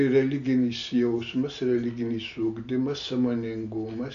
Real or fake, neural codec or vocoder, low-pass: real; none; 7.2 kHz